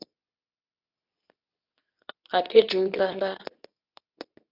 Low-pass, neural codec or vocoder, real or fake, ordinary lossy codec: 5.4 kHz; codec, 24 kHz, 0.9 kbps, WavTokenizer, medium speech release version 2; fake; AAC, 48 kbps